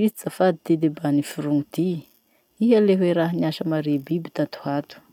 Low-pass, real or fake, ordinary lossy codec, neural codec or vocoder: 19.8 kHz; real; none; none